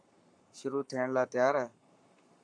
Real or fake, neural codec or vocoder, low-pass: fake; codec, 44.1 kHz, 7.8 kbps, Pupu-Codec; 9.9 kHz